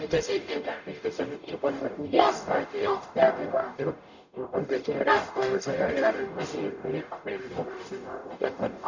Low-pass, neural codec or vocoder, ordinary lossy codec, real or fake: 7.2 kHz; codec, 44.1 kHz, 0.9 kbps, DAC; none; fake